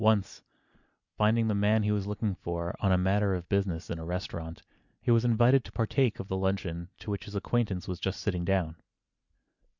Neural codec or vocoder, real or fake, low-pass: none; real; 7.2 kHz